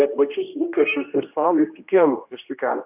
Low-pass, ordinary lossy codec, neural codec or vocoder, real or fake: 3.6 kHz; AAC, 32 kbps; codec, 16 kHz, 1 kbps, X-Codec, HuBERT features, trained on general audio; fake